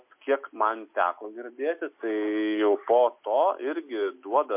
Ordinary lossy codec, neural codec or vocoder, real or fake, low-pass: MP3, 32 kbps; none; real; 3.6 kHz